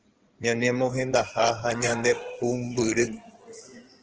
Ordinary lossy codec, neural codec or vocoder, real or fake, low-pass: Opus, 16 kbps; codec, 16 kHz in and 24 kHz out, 2.2 kbps, FireRedTTS-2 codec; fake; 7.2 kHz